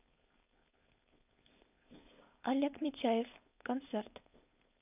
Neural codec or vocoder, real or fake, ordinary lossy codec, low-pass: codec, 16 kHz, 4.8 kbps, FACodec; fake; none; 3.6 kHz